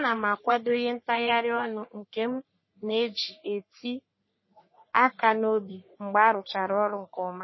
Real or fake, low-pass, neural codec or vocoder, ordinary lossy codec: fake; 7.2 kHz; codec, 44.1 kHz, 3.4 kbps, Pupu-Codec; MP3, 24 kbps